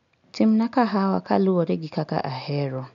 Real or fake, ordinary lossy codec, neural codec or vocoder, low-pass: real; none; none; 7.2 kHz